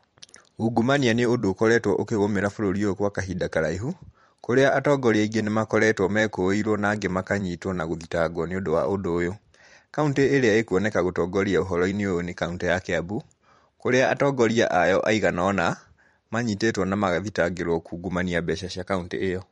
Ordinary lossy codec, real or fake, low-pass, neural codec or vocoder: MP3, 48 kbps; fake; 19.8 kHz; vocoder, 44.1 kHz, 128 mel bands every 512 samples, BigVGAN v2